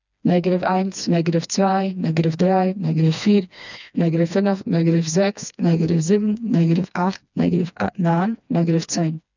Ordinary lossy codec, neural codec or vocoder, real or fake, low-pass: none; codec, 16 kHz, 2 kbps, FreqCodec, smaller model; fake; 7.2 kHz